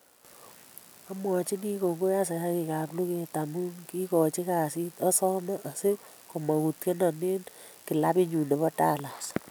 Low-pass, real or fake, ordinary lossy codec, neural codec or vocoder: none; real; none; none